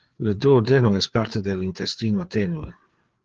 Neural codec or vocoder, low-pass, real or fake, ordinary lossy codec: codec, 16 kHz, 4 kbps, FreqCodec, larger model; 7.2 kHz; fake; Opus, 16 kbps